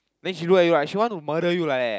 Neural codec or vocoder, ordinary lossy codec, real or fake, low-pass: none; none; real; none